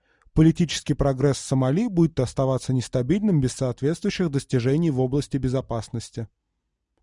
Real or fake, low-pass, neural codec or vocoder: real; 10.8 kHz; none